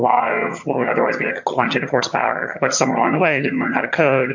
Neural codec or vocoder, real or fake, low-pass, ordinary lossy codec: vocoder, 22.05 kHz, 80 mel bands, HiFi-GAN; fake; 7.2 kHz; MP3, 48 kbps